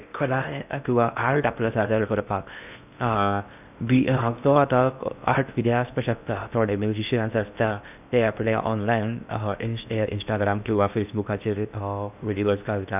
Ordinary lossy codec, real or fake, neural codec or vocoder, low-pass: none; fake; codec, 16 kHz in and 24 kHz out, 0.6 kbps, FocalCodec, streaming, 2048 codes; 3.6 kHz